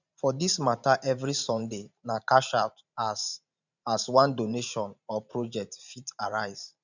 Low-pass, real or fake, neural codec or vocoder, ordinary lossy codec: 7.2 kHz; fake; vocoder, 44.1 kHz, 128 mel bands every 512 samples, BigVGAN v2; none